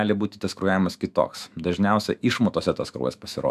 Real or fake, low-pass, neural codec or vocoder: fake; 14.4 kHz; autoencoder, 48 kHz, 128 numbers a frame, DAC-VAE, trained on Japanese speech